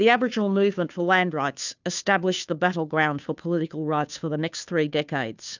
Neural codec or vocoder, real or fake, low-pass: codec, 16 kHz, 2 kbps, FunCodec, trained on Chinese and English, 25 frames a second; fake; 7.2 kHz